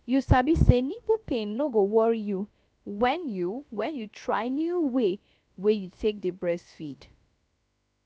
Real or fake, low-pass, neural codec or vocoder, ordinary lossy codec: fake; none; codec, 16 kHz, about 1 kbps, DyCAST, with the encoder's durations; none